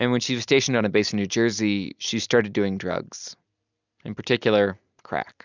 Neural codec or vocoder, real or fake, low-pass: none; real; 7.2 kHz